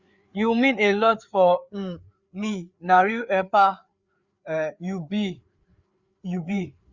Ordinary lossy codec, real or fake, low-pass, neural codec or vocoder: Opus, 64 kbps; fake; 7.2 kHz; codec, 16 kHz, 4 kbps, FreqCodec, larger model